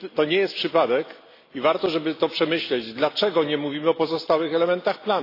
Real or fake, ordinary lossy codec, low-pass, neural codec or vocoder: real; AAC, 32 kbps; 5.4 kHz; none